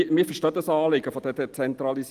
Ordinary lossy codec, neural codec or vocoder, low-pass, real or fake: Opus, 24 kbps; none; 14.4 kHz; real